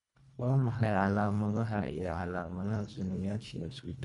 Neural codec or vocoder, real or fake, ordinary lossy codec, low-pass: codec, 24 kHz, 1.5 kbps, HILCodec; fake; none; 10.8 kHz